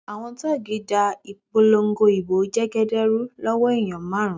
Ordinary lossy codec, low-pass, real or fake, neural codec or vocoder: none; none; real; none